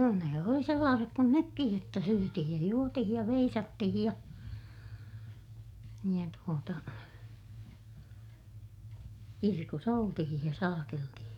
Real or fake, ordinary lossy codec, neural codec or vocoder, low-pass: fake; none; codec, 44.1 kHz, 7.8 kbps, DAC; 19.8 kHz